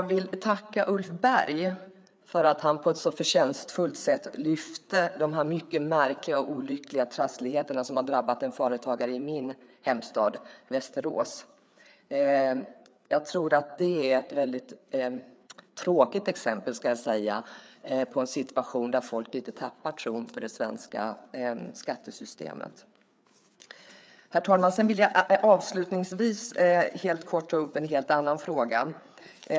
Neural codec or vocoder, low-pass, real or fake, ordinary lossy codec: codec, 16 kHz, 4 kbps, FreqCodec, larger model; none; fake; none